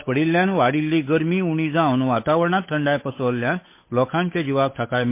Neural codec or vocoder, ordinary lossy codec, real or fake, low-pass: codec, 16 kHz, 8 kbps, FunCodec, trained on Chinese and English, 25 frames a second; MP3, 24 kbps; fake; 3.6 kHz